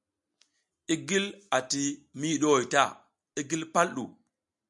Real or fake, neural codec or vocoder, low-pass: real; none; 10.8 kHz